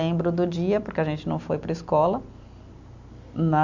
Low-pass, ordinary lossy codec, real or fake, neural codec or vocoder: 7.2 kHz; none; real; none